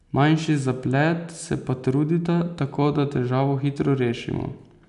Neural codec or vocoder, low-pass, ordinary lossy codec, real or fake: none; 10.8 kHz; none; real